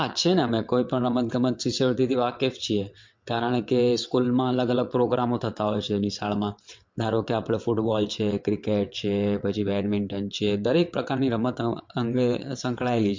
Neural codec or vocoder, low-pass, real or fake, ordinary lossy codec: vocoder, 22.05 kHz, 80 mel bands, WaveNeXt; 7.2 kHz; fake; MP3, 48 kbps